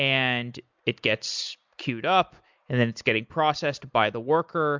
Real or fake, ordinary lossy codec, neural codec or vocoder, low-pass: real; MP3, 64 kbps; none; 7.2 kHz